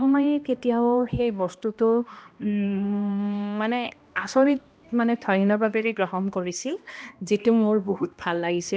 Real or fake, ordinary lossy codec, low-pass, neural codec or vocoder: fake; none; none; codec, 16 kHz, 1 kbps, X-Codec, HuBERT features, trained on balanced general audio